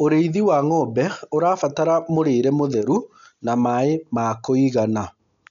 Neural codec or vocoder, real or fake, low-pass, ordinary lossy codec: none; real; 7.2 kHz; MP3, 96 kbps